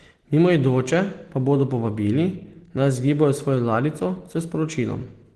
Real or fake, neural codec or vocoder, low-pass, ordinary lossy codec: real; none; 10.8 kHz; Opus, 16 kbps